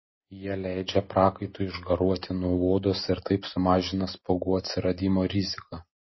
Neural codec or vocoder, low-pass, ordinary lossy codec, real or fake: none; 7.2 kHz; MP3, 24 kbps; real